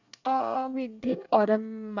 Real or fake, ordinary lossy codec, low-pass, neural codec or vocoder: fake; none; 7.2 kHz; codec, 24 kHz, 1 kbps, SNAC